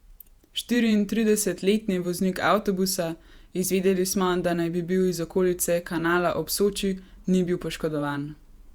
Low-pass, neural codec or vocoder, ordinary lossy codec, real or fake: 19.8 kHz; vocoder, 48 kHz, 128 mel bands, Vocos; Opus, 64 kbps; fake